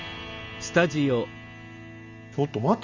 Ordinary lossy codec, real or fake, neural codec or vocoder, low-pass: none; real; none; 7.2 kHz